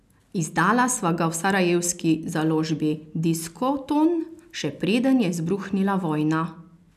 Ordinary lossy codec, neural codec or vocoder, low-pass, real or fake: none; none; 14.4 kHz; real